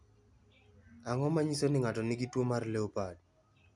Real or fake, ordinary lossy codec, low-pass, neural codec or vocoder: real; none; 10.8 kHz; none